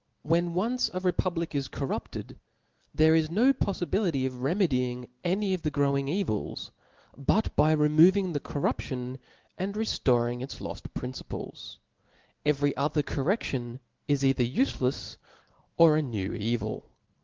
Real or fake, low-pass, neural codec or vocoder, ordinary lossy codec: real; 7.2 kHz; none; Opus, 16 kbps